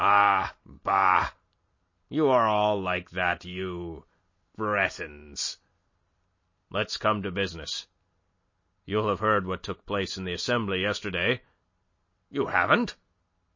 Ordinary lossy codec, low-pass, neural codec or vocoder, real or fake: MP3, 32 kbps; 7.2 kHz; none; real